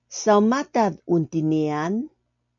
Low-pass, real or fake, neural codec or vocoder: 7.2 kHz; real; none